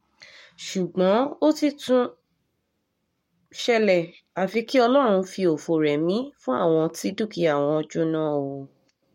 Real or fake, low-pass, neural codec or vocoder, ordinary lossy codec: fake; 19.8 kHz; codec, 44.1 kHz, 7.8 kbps, Pupu-Codec; MP3, 64 kbps